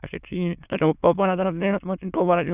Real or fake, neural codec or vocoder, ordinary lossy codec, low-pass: fake; autoencoder, 22.05 kHz, a latent of 192 numbers a frame, VITS, trained on many speakers; none; 3.6 kHz